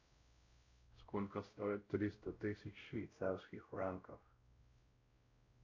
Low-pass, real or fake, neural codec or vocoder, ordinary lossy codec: 7.2 kHz; fake; codec, 16 kHz, 0.5 kbps, X-Codec, WavLM features, trained on Multilingual LibriSpeech; AAC, 48 kbps